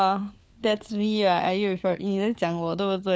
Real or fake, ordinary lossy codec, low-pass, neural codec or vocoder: fake; none; none; codec, 16 kHz, 4 kbps, FunCodec, trained on LibriTTS, 50 frames a second